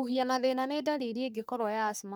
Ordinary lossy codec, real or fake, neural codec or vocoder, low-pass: none; fake; codec, 44.1 kHz, 3.4 kbps, Pupu-Codec; none